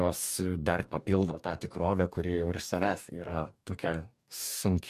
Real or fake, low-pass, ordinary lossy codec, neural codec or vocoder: fake; 14.4 kHz; MP3, 96 kbps; codec, 44.1 kHz, 2.6 kbps, DAC